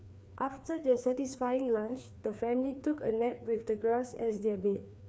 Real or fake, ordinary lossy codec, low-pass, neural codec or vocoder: fake; none; none; codec, 16 kHz, 2 kbps, FreqCodec, larger model